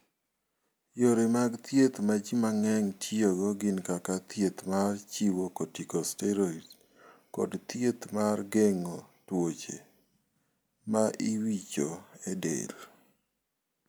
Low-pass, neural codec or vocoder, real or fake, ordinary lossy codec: none; none; real; none